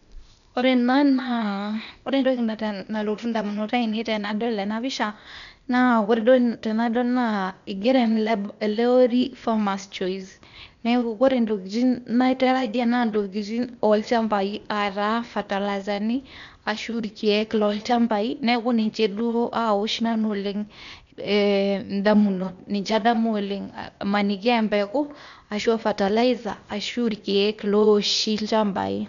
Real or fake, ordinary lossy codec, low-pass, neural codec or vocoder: fake; none; 7.2 kHz; codec, 16 kHz, 0.8 kbps, ZipCodec